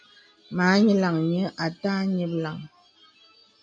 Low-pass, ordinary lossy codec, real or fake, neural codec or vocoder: 9.9 kHz; MP3, 64 kbps; real; none